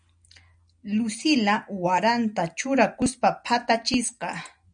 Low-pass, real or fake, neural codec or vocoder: 9.9 kHz; real; none